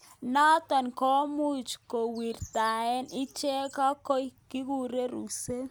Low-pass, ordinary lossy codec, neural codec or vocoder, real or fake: none; none; none; real